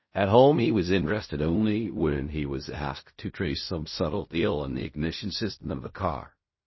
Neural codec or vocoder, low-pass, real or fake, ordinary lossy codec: codec, 16 kHz in and 24 kHz out, 0.4 kbps, LongCat-Audio-Codec, fine tuned four codebook decoder; 7.2 kHz; fake; MP3, 24 kbps